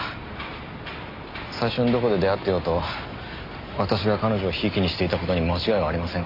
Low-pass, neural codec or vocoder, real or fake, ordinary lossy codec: 5.4 kHz; none; real; MP3, 32 kbps